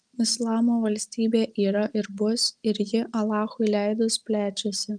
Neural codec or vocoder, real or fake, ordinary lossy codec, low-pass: none; real; Opus, 32 kbps; 9.9 kHz